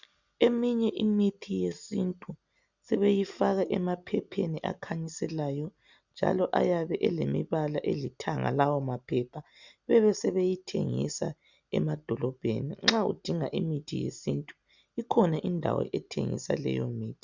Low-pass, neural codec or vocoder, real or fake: 7.2 kHz; none; real